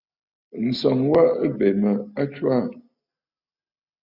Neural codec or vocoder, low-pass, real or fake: none; 5.4 kHz; real